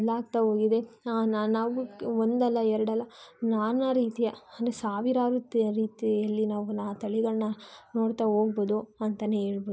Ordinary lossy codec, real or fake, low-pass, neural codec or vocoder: none; real; none; none